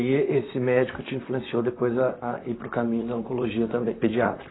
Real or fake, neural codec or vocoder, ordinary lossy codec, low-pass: fake; vocoder, 44.1 kHz, 128 mel bands, Pupu-Vocoder; AAC, 16 kbps; 7.2 kHz